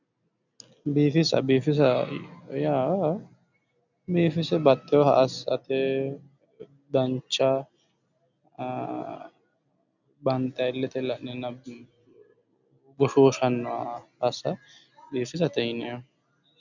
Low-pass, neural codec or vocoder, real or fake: 7.2 kHz; none; real